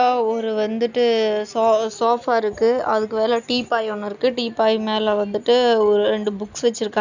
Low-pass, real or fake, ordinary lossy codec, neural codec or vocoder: 7.2 kHz; real; none; none